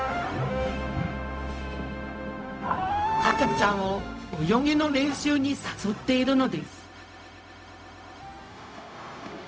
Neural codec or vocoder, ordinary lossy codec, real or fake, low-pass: codec, 16 kHz, 0.4 kbps, LongCat-Audio-Codec; none; fake; none